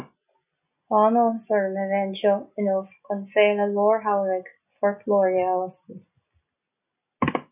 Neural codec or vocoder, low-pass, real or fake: none; 3.6 kHz; real